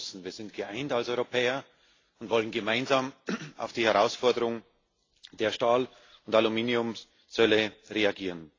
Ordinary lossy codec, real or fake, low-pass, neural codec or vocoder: AAC, 32 kbps; real; 7.2 kHz; none